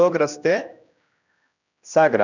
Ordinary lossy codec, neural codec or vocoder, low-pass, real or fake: none; codec, 16 kHz, 1 kbps, X-Codec, HuBERT features, trained on general audio; 7.2 kHz; fake